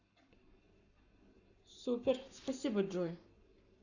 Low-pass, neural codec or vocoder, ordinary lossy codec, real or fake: 7.2 kHz; codec, 44.1 kHz, 7.8 kbps, Pupu-Codec; none; fake